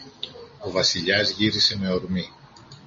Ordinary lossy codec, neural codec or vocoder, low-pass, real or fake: MP3, 32 kbps; none; 7.2 kHz; real